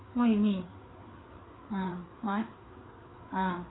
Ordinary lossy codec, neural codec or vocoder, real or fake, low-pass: AAC, 16 kbps; codec, 16 kHz, 4 kbps, FreqCodec, larger model; fake; 7.2 kHz